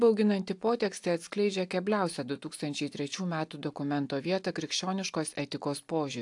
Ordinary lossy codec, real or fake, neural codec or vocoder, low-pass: AAC, 64 kbps; real; none; 10.8 kHz